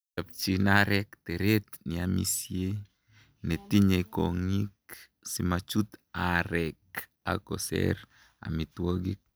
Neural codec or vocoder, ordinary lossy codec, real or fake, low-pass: none; none; real; none